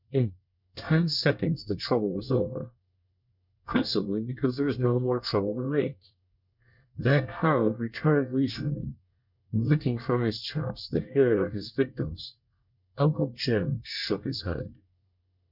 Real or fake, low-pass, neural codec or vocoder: fake; 5.4 kHz; codec, 24 kHz, 1 kbps, SNAC